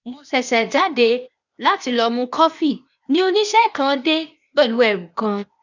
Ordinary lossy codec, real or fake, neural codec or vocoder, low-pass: none; fake; codec, 16 kHz, 0.8 kbps, ZipCodec; 7.2 kHz